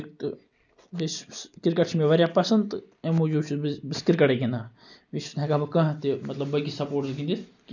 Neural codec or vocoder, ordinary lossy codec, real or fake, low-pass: none; none; real; 7.2 kHz